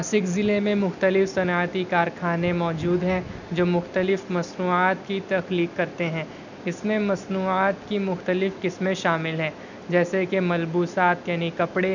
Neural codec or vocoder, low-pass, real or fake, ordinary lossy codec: none; 7.2 kHz; real; none